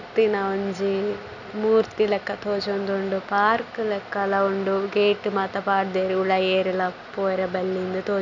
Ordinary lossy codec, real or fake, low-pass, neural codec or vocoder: none; real; 7.2 kHz; none